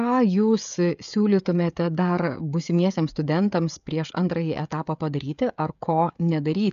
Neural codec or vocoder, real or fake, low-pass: codec, 16 kHz, 16 kbps, FreqCodec, smaller model; fake; 7.2 kHz